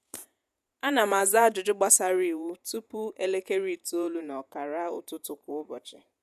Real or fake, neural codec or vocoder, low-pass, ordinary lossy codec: fake; vocoder, 48 kHz, 128 mel bands, Vocos; 14.4 kHz; none